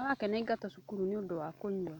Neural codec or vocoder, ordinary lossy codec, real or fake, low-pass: none; none; real; 19.8 kHz